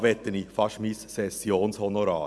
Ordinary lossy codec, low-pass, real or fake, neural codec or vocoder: none; none; real; none